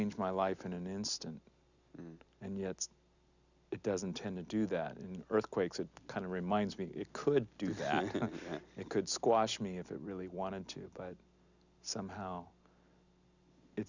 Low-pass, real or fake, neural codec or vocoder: 7.2 kHz; real; none